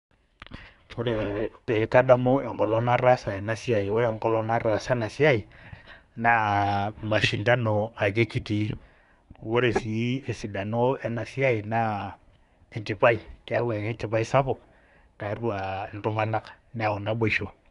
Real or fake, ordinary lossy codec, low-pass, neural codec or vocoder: fake; none; 10.8 kHz; codec, 24 kHz, 1 kbps, SNAC